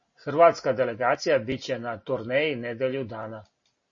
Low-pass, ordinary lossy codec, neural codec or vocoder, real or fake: 7.2 kHz; MP3, 32 kbps; none; real